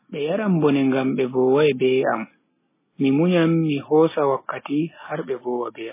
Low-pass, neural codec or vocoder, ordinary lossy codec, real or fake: 3.6 kHz; none; MP3, 16 kbps; real